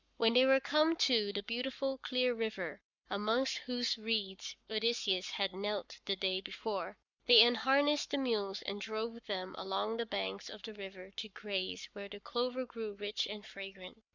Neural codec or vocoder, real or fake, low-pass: codec, 44.1 kHz, 7.8 kbps, Pupu-Codec; fake; 7.2 kHz